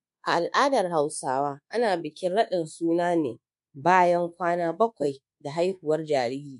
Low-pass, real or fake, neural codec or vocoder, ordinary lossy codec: 10.8 kHz; fake; codec, 24 kHz, 1.2 kbps, DualCodec; MP3, 64 kbps